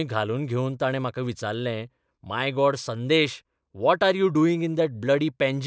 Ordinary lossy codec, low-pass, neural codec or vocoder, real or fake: none; none; none; real